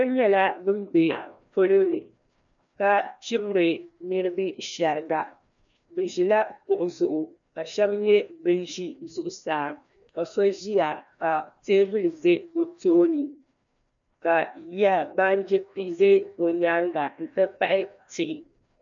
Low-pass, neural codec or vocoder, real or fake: 7.2 kHz; codec, 16 kHz, 1 kbps, FreqCodec, larger model; fake